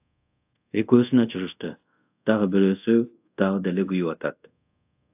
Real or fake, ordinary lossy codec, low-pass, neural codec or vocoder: fake; AAC, 32 kbps; 3.6 kHz; codec, 24 kHz, 0.5 kbps, DualCodec